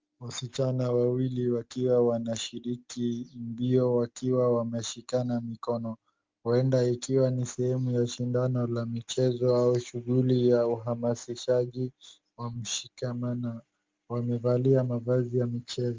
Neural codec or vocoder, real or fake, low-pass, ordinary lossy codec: none; real; 7.2 kHz; Opus, 32 kbps